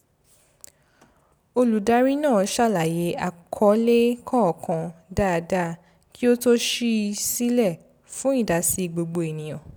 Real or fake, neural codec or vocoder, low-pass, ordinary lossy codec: real; none; none; none